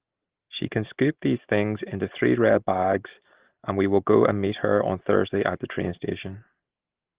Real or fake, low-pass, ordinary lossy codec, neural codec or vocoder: real; 3.6 kHz; Opus, 16 kbps; none